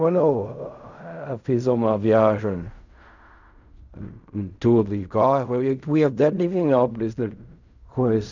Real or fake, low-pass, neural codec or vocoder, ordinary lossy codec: fake; 7.2 kHz; codec, 16 kHz in and 24 kHz out, 0.4 kbps, LongCat-Audio-Codec, fine tuned four codebook decoder; none